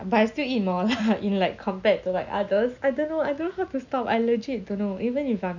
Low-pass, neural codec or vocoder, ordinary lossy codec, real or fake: 7.2 kHz; none; none; real